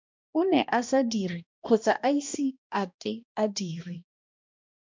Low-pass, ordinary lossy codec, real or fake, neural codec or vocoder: 7.2 kHz; MP3, 64 kbps; fake; codec, 16 kHz, 1 kbps, X-Codec, HuBERT features, trained on balanced general audio